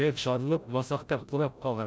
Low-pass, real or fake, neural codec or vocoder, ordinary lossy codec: none; fake; codec, 16 kHz, 0.5 kbps, FreqCodec, larger model; none